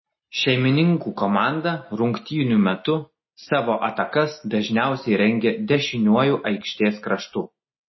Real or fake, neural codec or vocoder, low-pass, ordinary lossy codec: real; none; 7.2 kHz; MP3, 24 kbps